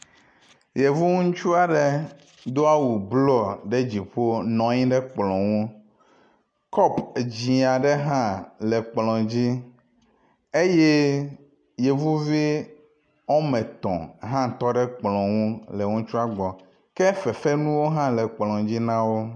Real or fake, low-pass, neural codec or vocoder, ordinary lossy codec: real; 9.9 kHz; none; MP3, 64 kbps